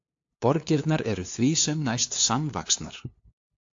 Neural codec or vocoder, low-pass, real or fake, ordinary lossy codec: codec, 16 kHz, 2 kbps, FunCodec, trained on LibriTTS, 25 frames a second; 7.2 kHz; fake; AAC, 48 kbps